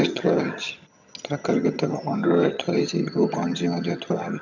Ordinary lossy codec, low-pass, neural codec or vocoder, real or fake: none; 7.2 kHz; vocoder, 22.05 kHz, 80 mel bands, HiFi-GAN; fake